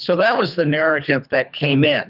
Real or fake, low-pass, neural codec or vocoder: fake; 5.4 kHz; codec, 24 kHz, 3 kbps, HILCodec